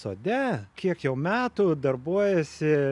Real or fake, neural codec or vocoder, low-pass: real; none; 10.8 kHz